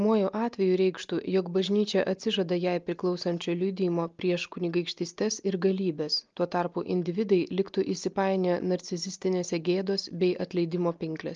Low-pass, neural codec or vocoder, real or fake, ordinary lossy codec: 7.2 kHz; none; real; Opus, 24 kbps